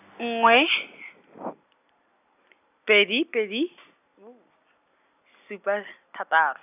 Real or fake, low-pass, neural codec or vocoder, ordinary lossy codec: real; 3.6 kHz; none; none